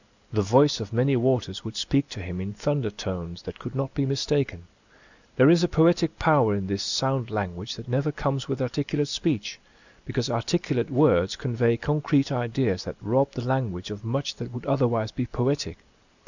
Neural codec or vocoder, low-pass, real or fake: none; 7.2 kHz; real